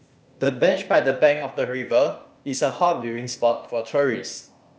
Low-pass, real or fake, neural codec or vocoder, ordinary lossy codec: none; fake; codec, 16 kHz, 0.8 kbps, ZipCodec; none